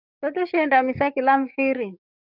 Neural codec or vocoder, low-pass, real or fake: codec, 44.1 kHz, 7.8 kbps, DAC; 5.4 kHz; fake